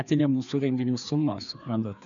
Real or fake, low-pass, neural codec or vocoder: fake; 7.2 kHz; codec, 16 kHz, 2 kbps, FreqCodec, larger model